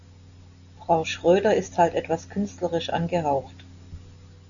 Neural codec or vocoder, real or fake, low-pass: none; real; 7.2 kHz